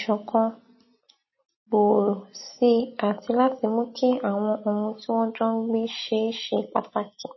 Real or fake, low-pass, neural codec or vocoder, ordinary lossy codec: real; 7.2 kHz; none; MP3, 24 kbps